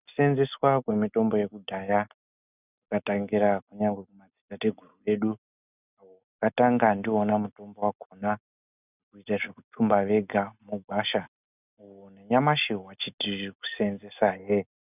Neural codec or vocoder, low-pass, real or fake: none; 3.6 kHz; real